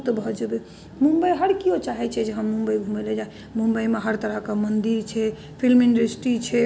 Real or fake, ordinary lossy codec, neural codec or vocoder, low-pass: real; none; none; none